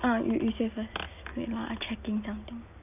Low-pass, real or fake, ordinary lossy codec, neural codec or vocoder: 3.6 kHz; real; none; none